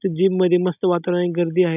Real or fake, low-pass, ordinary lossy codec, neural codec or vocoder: real; 3.6 kHz; none; none